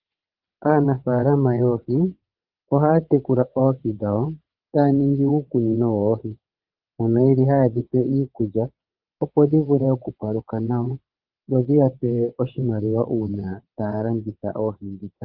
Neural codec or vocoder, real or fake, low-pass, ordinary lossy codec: vocoder, 22.05 kHz, 80 mel bands, WaveNeXt; fake; 5.4 kHz; Opus, 32 kbps